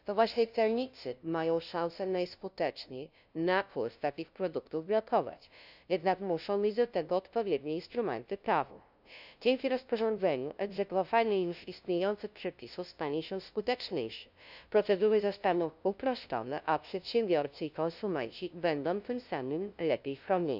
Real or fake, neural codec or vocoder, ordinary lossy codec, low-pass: fake; codec, 16 kHz, 0.5 kbps, FunCodec, trained on LibriTTS, 25 frames a second; none; 5.4 kHz